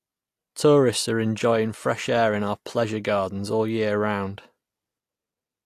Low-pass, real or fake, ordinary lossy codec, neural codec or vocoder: 14.4 kHz; real; AAC, 64 kbps; none